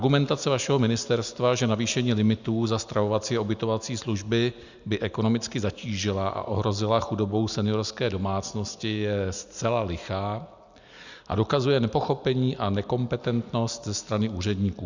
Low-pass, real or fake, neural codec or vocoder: 7.2 kHz; real; none